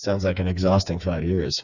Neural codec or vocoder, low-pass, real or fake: codec, 16 kHz, 4 kbps, FreqCodec, smaller model; 7.2 kHz; fake